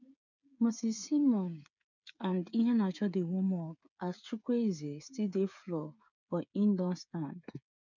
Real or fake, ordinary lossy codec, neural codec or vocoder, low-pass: fake; none; codec, 16 kHz, 8 kbps, FreqCodec, smaller model; 7.2 kHz